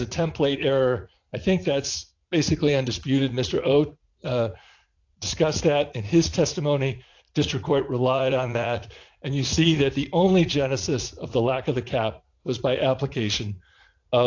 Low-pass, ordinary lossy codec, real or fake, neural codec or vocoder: 7.2 kHz; AAC, 48 kbps; fake; vocoder, 44.1 kHz, 80 mel bands, Vocos